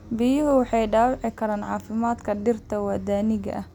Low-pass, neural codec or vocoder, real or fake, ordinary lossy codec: 19.8 kHz; none; real; none